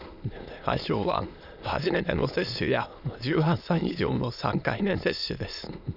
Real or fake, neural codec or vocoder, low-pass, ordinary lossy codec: fake; autoencoder, 22.05 kHz, a latent of 192 numbers a frame, VITS, trained on many speakers; 5.4 kHz; none